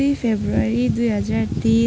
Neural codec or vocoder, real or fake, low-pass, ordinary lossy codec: none; real; none; none